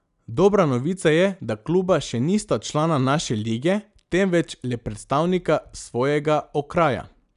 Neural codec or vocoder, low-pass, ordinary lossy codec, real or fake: none; 10.8 kHz; none; real